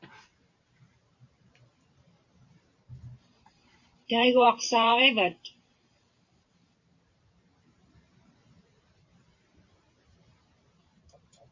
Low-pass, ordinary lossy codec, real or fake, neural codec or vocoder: 7.2 kHz; MP3, 64 kbps; fake; vocoder, 44.1 kHz, 128 mel bands every 512 samples, BigVGAN v2